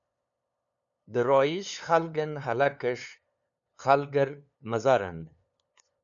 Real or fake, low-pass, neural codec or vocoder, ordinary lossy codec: fake; 7.2 kHz; codec, 16 kHz, 2 kbps, FunCodec, trained on LibriTTS, 25 frames a second; MP3, 96 kbps